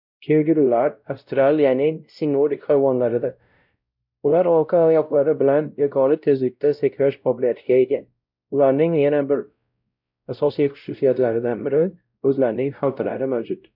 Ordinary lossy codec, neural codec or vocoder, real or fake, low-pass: none; codec, 16 kHz, 0.5 kbps, X-Codec, WavLM features, trained on Multilingual LibriSpeech; fake; 5.4 kHz